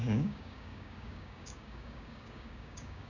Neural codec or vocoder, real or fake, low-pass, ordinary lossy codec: codec, 16 kHz, 6 kbps, DAC; fake; 7.2 kHz; none